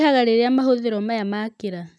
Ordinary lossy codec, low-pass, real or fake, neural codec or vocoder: none; none; real; none